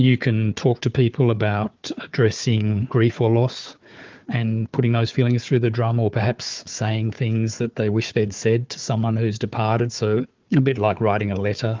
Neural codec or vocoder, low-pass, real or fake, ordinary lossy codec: codec, 16 kHz, 4 kbps, FunCodec, trained on Chinese and English, 50 frames a second; 7.2 kHz; fake; Opus, 24 kbps